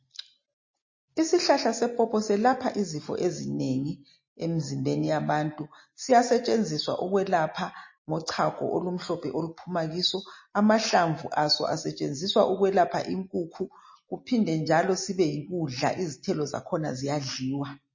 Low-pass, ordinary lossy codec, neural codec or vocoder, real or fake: 7.2 kHz; MP3, 32 kbps; none; real